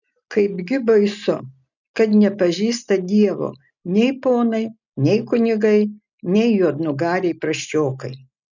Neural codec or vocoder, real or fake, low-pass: none; real; 7.2 kHz